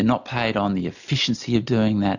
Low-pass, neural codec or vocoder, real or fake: 7.2 kHz; none; real